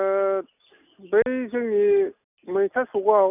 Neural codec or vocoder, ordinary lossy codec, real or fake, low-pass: none; none; real; 3.6 kHz